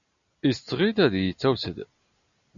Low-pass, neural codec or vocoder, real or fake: 7.2 kHz; none; real